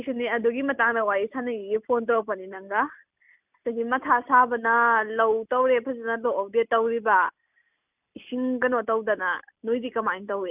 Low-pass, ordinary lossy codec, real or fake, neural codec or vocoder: 3.6 kHz; none; real; none